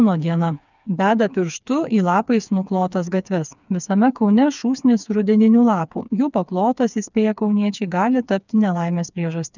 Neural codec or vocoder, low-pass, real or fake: codec, 16 kHz, 4 kbps, FreqCodec, smaller model; 7.2 kHz; fake